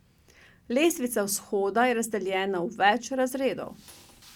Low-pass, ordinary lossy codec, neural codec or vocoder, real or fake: 19.8 kHz; none; none; real